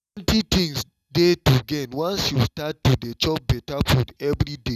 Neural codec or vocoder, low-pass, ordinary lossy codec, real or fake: none; 14.4 kHz; none; real